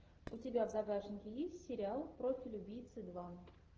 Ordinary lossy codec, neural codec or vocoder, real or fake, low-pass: Opus, 16 kbps; none; real; 7.2 kHz